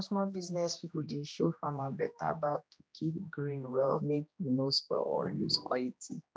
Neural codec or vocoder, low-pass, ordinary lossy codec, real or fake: codec, 16 kHz, 1 kbps, X-Codec, HuBERT features, trained on general audio; none; none; fake